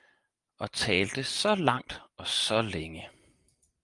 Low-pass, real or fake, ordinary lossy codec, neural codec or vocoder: 10.8 kHz; real; Opus, 32 kbps; none